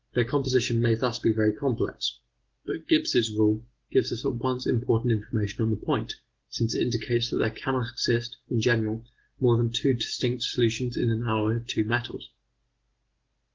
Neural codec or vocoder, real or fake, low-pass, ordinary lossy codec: none; real; 7.2 kHz; Opus, 16 kbps